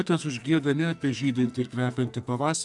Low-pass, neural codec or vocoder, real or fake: 10.8 kHz; codec, 44.1 kHz, 2.6 kbps, SNAC; fake